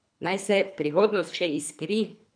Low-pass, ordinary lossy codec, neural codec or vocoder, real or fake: 9.9 kHz; none; codec, 24 kHz, 3 kbps, HILCodec; fake